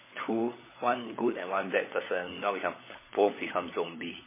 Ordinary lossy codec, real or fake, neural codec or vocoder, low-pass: MP3, 16 kbps; fake; codec, 16 kHz, 16 kbps, FunCodec, trained on LibriTTS, 50 frames a second; 3.6 kHz